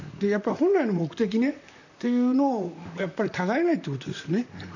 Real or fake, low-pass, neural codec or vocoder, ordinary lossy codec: fake; 7.2 kHz; vocoder, 44.1 kHz, 128 mel bands, Pupu-Vocoder; none